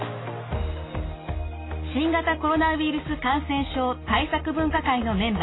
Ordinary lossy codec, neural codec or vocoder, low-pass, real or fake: AAC, 16 kbps; none; 7.2 kHz; real